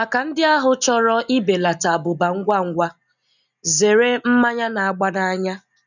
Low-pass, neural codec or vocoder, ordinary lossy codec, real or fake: 7.2 kHz; none; none; real